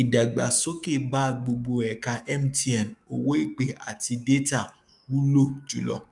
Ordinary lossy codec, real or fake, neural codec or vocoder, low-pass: none; fake; codec, 44.1 kHz, 7.8 kbps, DAC; 10.8 kHz